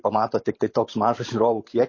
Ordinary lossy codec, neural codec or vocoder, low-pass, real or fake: MP3, 32 kbps; vocoder, 44.1 kHz, 128 mel bands every 512 samples, BigVGAN v2; 7.2 kHz; fake